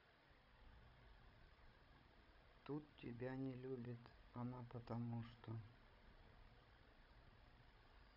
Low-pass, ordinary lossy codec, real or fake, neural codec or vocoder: 5.4 kHz; MP3, 48 kbps; fake; codec, 16 kHz, 16 kbps, FreqCodec, larger model